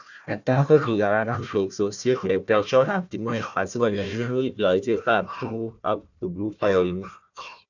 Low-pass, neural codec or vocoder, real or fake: 7.2 kHz; codec, 16 kHz, 1 kbps, FunCodec, trained on Chinese and English, 50 frames a second; fake